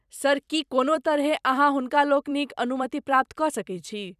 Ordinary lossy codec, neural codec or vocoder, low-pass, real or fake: none; vocoder, 44.1 kHz, 128 mel bands every 256 samples, BigVGAN v2; 14.4 kHz; fake